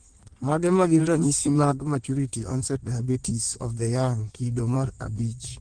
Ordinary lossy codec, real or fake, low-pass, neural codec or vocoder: Opus, 16 kbps; fake; 9.9 kHz; codec, 16 kHz in and 24 kHz out, 1.1 kbps, FireRedTTS-2 codec